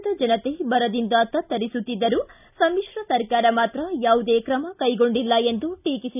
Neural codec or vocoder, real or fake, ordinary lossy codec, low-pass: none; real; none; 3.6 kHz